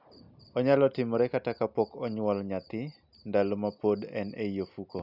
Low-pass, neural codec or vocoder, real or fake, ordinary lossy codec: 5.4 kHz; none; real; none